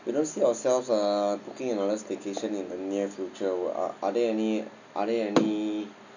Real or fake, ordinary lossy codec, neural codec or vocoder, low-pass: real; none; none; 7.2 kHz